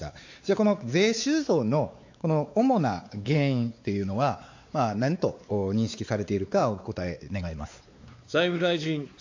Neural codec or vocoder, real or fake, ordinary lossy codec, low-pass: codec, 16 kHz, 4 kbps, X-Codec, WavLM features, trained on Multilingual LibriSpeech; fake; AAC, 48 kbps; 7.2 kHz